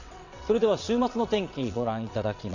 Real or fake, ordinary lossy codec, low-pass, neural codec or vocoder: fake; none; 7.2 kHz; vocoder, 22.05 kHz, 80 mel bands, WaveNeXt